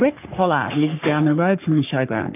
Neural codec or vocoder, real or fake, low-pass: codec, 44.1 kHz, 3.4 kbps, Pupu-Codec; fake; 3.6 kHz